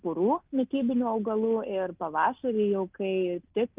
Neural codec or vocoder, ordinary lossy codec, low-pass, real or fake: none; Opus, 24 kbps; 3.6 kHz; real